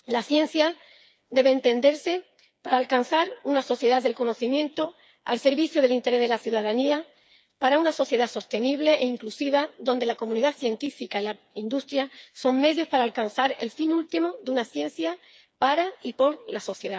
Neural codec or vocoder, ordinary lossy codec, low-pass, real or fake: codec, 16 kHz, 4 kbps, FreqCodec, smaller model; none; none; fake